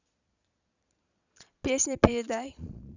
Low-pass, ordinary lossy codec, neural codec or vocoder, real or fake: 7.2 kHz; none; vocoder, 22.05 kHz, 80 mel bands, Vocos; fake